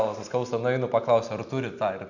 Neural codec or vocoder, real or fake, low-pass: none; real; 7.2 kHz